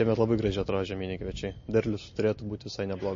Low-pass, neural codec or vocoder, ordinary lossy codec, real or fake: 7.2 kHz; none; MP3, 32 kbps; real